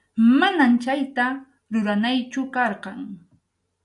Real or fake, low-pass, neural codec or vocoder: real; 10.8 kHz; none